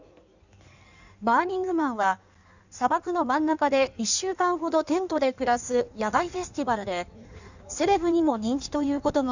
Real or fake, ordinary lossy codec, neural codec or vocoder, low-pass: fake; none; codec, 16 kHz in and 24 kHz out, 1.1 kbps, FireRedTTS-2 codec; 7.2 kHz